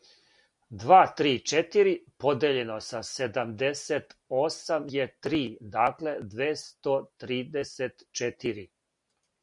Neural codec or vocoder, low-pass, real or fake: none; 10.8 kHz; real